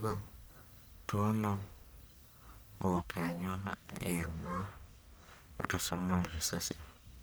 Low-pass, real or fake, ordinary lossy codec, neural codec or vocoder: none; fake; none; codec, 44.1 kHz, 1.7 kbps, Pupu-Codec